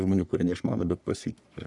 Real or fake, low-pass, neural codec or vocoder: fake; 10.8 kHz; codec, 44.1 kHz, 3.4 kbps, Pupu-Codec